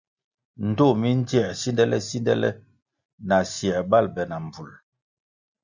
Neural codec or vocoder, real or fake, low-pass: none; real; 7.2 kHz